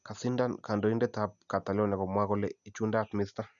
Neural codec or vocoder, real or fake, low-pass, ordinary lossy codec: none; real; 7.2 kHz; none